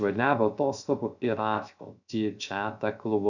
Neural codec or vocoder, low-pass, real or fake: codec, 16 kHz, 0.3 kbps, FocalCodec; 7.2 kHz; fake